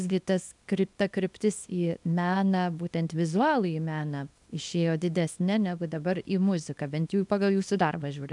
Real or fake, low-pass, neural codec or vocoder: fake; 10.8 kHz; codec, 24 kHz, 0.9 kbps, WavTokenizer, small release